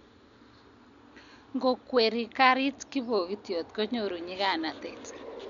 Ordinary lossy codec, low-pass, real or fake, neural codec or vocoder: none; 7.2 kHz; real; none